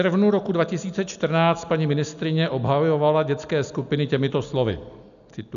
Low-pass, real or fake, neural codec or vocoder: 7.2 kHz; real; none